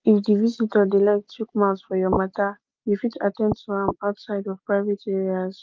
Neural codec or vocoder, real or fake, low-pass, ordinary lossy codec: none; real; 7.2 kHz; Opus, 32 kbps